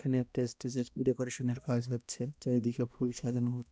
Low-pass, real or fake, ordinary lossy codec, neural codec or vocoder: none; fake; none; codec, 16 kHz, 1 kbps, X-Codec, HuBERT features, trained on balanced general audio